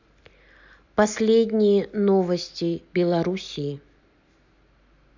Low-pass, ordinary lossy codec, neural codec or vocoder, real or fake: 7.2 kHz; none; none; real